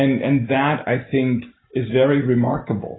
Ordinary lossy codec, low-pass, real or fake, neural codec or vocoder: AAC, 16 kbps; 7.2 kHz; real; none